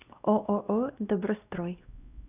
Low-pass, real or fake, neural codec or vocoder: 3.6 kHz; fake; codec, 16 kHz, 1 kbps, X-Codec, WavLM features, trained on Multilingual LibriSpeech